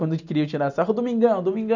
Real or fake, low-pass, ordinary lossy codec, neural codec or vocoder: real; 7.2 kHz; none; none